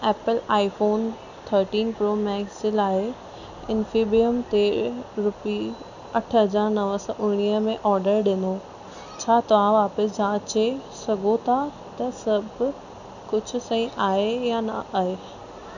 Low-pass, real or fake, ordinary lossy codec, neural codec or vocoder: 7.2 kHz; real; none; none